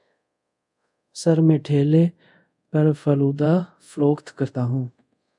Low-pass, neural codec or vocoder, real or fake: 10.8 kHz; codec, 24 kHz, 0.5 kbps, DualCodec; fake